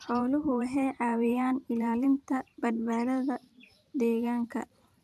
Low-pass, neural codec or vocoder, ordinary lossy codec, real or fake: 14.4 kHz; vocoder, 44.1 kHz, 128 mel bands every 512 samples, BigVGAN v2; none; fake